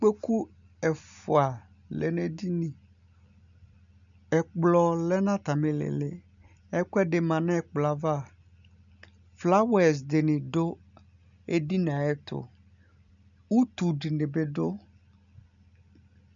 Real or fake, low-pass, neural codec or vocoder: real; 7.2 kHz; none